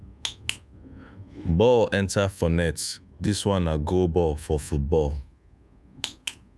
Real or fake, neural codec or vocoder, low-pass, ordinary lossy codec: fake; codec, 24 kHz, 1.2 kbps, DualCodec; none; none